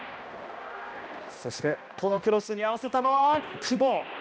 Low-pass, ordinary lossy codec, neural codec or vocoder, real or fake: none; none; codec, 16 kHz, 1 kbps, X-Codec, HuBERT features, trained on balanced general audio; fake